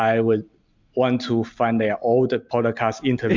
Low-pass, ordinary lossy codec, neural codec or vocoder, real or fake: 7.2 kHz; MP3, 64 kbps; none; real